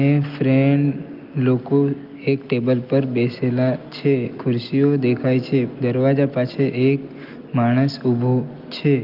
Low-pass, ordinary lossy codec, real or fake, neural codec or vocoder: 5.4 kHz; Opus, 24 kbps; real; none